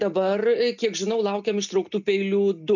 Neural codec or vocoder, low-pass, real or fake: none; 7.2 kHz; real